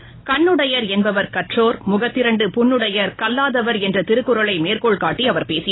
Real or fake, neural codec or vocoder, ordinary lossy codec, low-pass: real; none; AAC, 16 kbps; 7.2 kHz